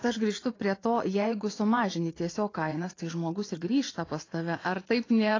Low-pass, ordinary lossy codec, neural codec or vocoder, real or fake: 7.2 kHz; AAC, 32 kbps; vocoder, 24 kHz, 100 mel bands, Vocos; fake